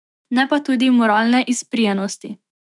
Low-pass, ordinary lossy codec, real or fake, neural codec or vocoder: 10.8 kHz; none; fake; autoencoder, 48 kHz, 128 numbers a frame, DAC-VAE, trained on Japanese speech